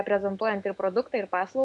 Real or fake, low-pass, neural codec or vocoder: fake; 10.8 kHz; autoencoder, 48 kHz, 128 numbers a frame, DAC-VAE, trained on Japanese speech